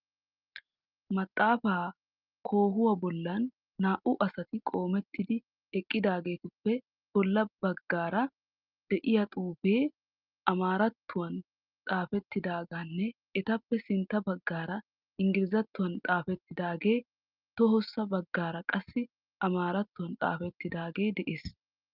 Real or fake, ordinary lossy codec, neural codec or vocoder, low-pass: real; Opus, 24 kbps; none; 5.4 kHz